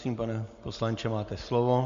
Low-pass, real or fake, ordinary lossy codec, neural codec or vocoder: 7.2 kHz; real; MP3, 48 kbps; none